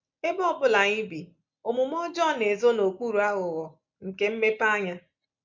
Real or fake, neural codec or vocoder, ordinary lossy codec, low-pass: real; none; MP3, 64 kbps; 7.2 kHz